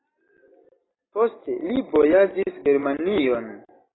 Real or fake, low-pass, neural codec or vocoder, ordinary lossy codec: real; 7.2 kHz; none; AAC, 16 kbps